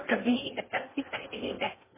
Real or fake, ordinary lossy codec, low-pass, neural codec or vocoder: fake; MP3, 16 kbps; 3.6 kHz; codec, 16 kHz in and 24 kHz out, 0.6 kbps, FocalCodec, streaming, 4096 codes